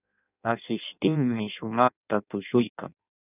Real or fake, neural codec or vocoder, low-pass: fake; codec, 16 kHz in and 24 kHz out, 0.6 kbps, FireRedTTS-2 codec; 3.6 kHz